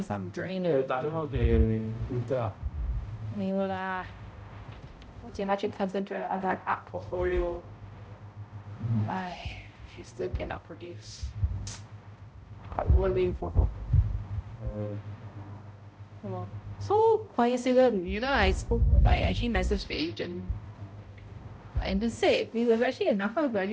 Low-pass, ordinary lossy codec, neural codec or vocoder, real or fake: none; none; codec, 16 kHz, 0.5 kbps, X-Codec, HuBERT features, trained on balanced general audio; fake